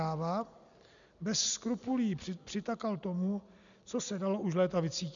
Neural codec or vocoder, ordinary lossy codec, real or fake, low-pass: none; MP3, 96 kbps; real; 7.2 kHz